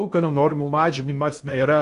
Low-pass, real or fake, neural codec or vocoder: 10.8 kHz; fake; codec, 16 kHz in and 24 kHz out, 0.6 kbps, FocalCodec, streaming, 4096 codes